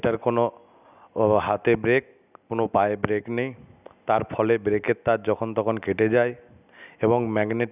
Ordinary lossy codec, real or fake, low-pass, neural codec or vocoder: none; real; 3.6 kHz; none